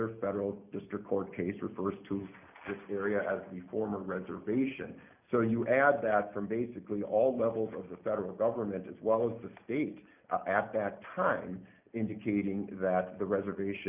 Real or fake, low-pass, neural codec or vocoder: real; 3.6 kHz; none